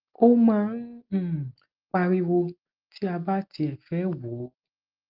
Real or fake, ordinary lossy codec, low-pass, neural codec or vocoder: real; Opus, 32 kbps; 5.4 kHz; none